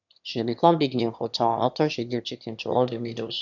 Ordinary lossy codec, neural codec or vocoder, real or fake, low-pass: none; autoencoder, 22.05 kHz, a latent of 192 numbers a frame, VITS, trained on one speaker; fake; 7.2 kHz